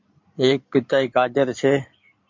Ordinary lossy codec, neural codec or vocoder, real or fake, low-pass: MP3, 64 kbps; codec, 16 kHz in and 24 kHz out, 2.2 kbps, FireRedTTS-2 codec; fake; 7.2 kHz